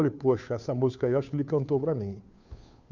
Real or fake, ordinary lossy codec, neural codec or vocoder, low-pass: fake; none; codec, 16 kHz, 2 kbps, FunCodec, trained on Chinese and English, 25 frames a second; 7.2 kHz